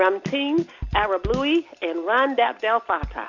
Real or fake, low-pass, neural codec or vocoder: real; 7.2 kHz; none